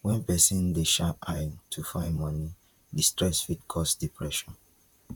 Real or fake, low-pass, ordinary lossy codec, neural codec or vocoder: fake; 19.8 kHz; none; vocoder, 44.1 kHz, 128 mel bands, Pupu-Vocoder